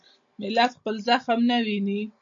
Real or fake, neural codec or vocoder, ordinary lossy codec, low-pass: real; none; AAC, 64 kbps; 7.2 kHz